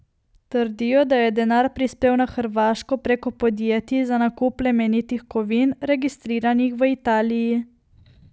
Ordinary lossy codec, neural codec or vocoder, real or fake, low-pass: none; none; real; none